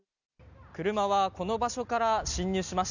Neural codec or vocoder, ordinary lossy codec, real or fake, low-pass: none; none; real; 7.2 kHz